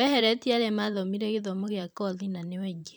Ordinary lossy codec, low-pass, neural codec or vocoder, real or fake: none; none; vocoder, 44.1 kHz, 128 mel bands every 512 samples, BigVGAN v2; fake